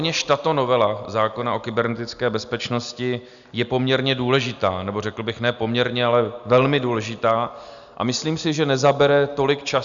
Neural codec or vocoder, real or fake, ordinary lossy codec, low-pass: none; real; MP3, 96 kbps; 7.2 kHz